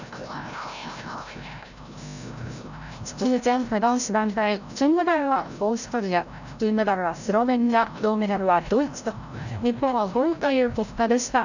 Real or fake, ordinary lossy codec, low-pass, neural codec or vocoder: fake; none; 7.2 kHz; codec, 16 kHz, 0.5 kbps, FreqCodec, larger model